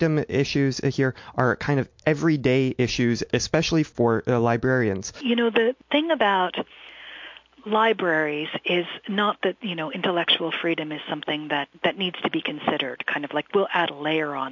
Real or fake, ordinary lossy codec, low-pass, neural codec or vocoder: real; MP3, 48 kbps; 7.2 kHz; none